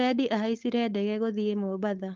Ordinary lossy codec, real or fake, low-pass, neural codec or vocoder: Opus, 32 kbps; fake; 7.2 kHz; codec, 16 kHz, 4.8 kbps, FACodec